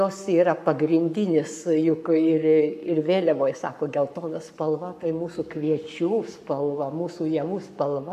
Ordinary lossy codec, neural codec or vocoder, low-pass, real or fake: AAC, 96 kbps; codec, 44.1 kHz, 7.8 kbps, Pupu-Codec; 14.4 kHz; fake